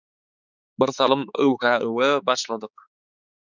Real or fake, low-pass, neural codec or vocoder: fake; 7.2 kHz; codec, 16 kHz, 4 kbps, X-Codec, HuBERT features, trained on balanced general audio